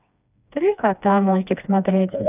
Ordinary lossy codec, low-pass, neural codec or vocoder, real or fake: none; 3.6 kHz; codec, 16 kHz, 2 kbps, FreqCodec, smaller model; fake